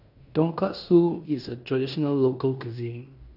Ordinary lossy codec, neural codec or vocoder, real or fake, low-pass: none; codec, 16 kHz in and 24 kHz out, 0.9 kbps, LongCat-Audio-Codec, fine tuned four codebook decoder; fake; 5.4 kHz